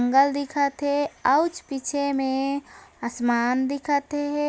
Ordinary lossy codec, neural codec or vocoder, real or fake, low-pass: none; none; real; none